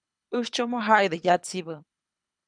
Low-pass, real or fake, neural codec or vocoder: 9.9 kHz; fake; codec, 24 kHz, 6 kbps, HILCodec